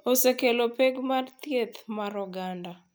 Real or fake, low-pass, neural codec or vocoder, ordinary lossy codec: real; none; none; none